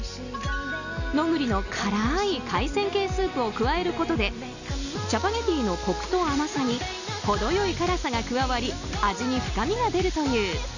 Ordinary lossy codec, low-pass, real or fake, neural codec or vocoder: none; 7.2 kHz; real; none